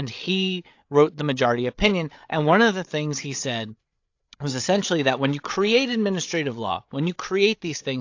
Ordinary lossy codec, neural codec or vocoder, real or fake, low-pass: AAC, 48 kbps; codec, 16 kHz, 8 kbps, FreqCodec, larger model; fake; 7.2 kHz